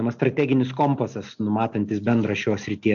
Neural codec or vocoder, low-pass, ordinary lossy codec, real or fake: none; 7.2 kHz; AAC, 64 kbps; real